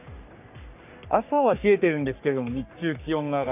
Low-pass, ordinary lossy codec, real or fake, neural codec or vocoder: 3.6 kHz; none; fake; codec, 44.1 kHz, 3.4 kbps, Pupu-Codec